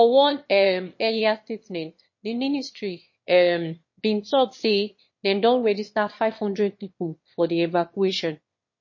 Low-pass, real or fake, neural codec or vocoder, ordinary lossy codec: 7.2 kHz; fake; autoencoder, 22.05 kHz, a latent of 192 numbers a frame, VITS, trained on one speaker; MP3, 32 kbps